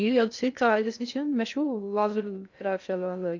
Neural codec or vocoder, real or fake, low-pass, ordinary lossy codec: codec, 16 kHz in and 24 kHz out, 0.6 kbps, FocalCodec, streaming, 2048 codes; fake; 7.2 kHz; none